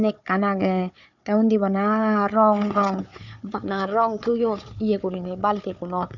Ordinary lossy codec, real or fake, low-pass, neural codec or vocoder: none; fake; 7.2 kHz; codec, 16 kHz, 4 kbps, FunCodec, trained on Chinese and English, 50 frames a second